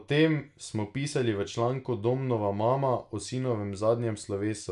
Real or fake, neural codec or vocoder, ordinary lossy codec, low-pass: real; none; none; 10.8 kHz